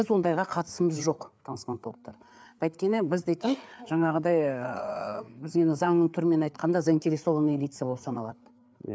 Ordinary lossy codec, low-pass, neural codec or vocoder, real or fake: none; none; codec, 16 kHz, 4 kbps, FreqCodec, larger model; fake